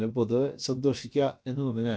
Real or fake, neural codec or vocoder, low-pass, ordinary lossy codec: fake; codec, 16 kHz, about 1 kbps, DyCAST, with the encoder's durations; none; none